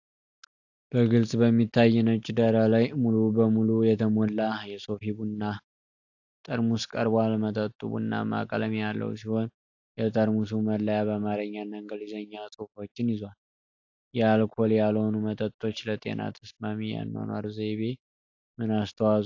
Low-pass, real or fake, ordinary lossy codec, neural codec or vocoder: 7.2 kHz; real; AAC, 48 kbps; none